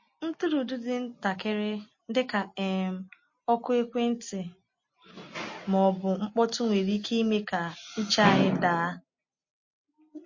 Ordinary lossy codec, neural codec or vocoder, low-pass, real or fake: MP3, 32 kbps; none; 7.2 kHz; real